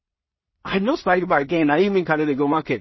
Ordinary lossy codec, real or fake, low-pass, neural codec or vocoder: MP3, 24 kbps; fake; 7.2 kHz; codec, 16 kHz in and 24 kHz out, 0.4 kbps, LongCat-Audio-Codec, two codebook decoder